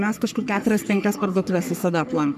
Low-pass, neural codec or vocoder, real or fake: 14.4 kHz; codec, 44.1 kHz, 3.4 kbps, Pupu-Codec; fake